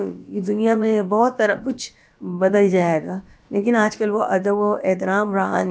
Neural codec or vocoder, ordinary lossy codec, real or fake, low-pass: codec, 16 kHz, about 1 kbps, DyCAST, with the encoder's durations; none; fake; none